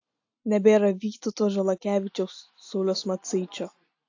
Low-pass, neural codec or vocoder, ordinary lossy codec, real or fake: 7.2 kHz; none; AAC, 48 kbps; real